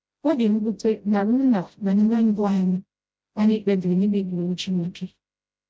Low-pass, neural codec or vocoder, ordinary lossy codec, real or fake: none; codec, 16 kHz, 0.5 kbps, FreqCodec, smaller model; none; fake